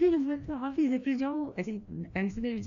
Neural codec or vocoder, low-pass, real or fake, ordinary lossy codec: codec, 16 kHz, 1 kbps, FreqCodec, larger model; 7.2 kHz; fake; none